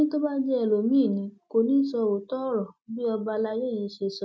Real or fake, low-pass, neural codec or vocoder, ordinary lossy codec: real; none; none; none